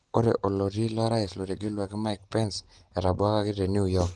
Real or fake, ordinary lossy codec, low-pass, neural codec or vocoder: real; Opus, 24 kbps; 10.8 kHz; none